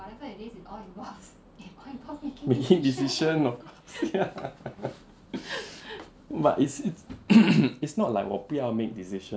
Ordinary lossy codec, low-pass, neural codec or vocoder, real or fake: none; none; none; real